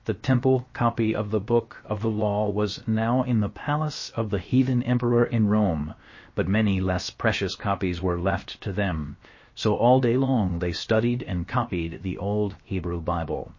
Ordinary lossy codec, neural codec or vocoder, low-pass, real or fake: MP3, 32 kbps; codec, 16 kHz, 0.7 kbps, FocalCodec; 7.2 kHz; fake